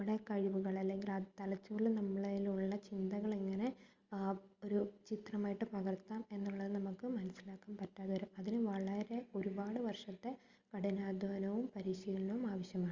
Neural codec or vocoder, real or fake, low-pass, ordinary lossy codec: none; real; 7.2 kHz; Opus, 32 kbps